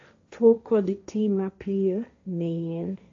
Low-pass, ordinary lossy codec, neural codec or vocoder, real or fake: 7.2 kHz; AAC, 48 kbps; codec, 16 kHz, 1.1 kbps, Voila-Tokenizer; fake